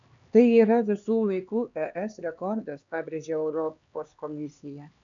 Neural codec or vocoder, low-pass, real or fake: codec, 16 kHz, 2 kbps, X-Codec, HuBERT features, trained on LibriSpeech; 7.2 kHz; fake